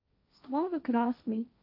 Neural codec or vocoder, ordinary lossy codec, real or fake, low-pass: codec, 16 kHz, 1.1 kbps, Voila-Tokenizer; AAC, 32 kbps; fake; 5.4 kHz